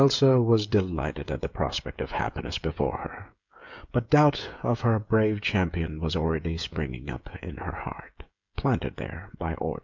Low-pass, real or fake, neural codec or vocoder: 7.2 kHz; fake; codec, 16 kHz, 16 kbps, FreqCodec, smaller model